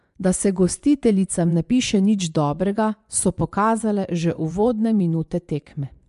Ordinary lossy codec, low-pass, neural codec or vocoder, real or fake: MP3, 64 kbps; 10.8 kHz; vocoder, 24 kHz, 100 mel bands, Vocos; fake